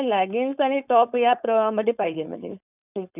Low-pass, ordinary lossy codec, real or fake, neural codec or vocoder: 3.6 kHz; none; fake; codec, 16 kHz, 4.8 kbps, FACodec